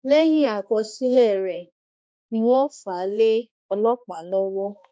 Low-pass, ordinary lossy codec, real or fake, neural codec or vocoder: none; none; fake; codec, 16 kHz, 1 kbps, X-Codec, HuBERT features, trained on balanced general audio